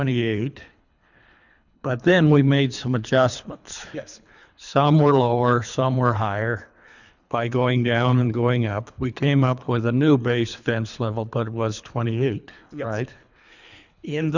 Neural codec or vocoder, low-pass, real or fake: codec, 24 kHz, 3 kbps, HILCodec; 7.2 kHz; fake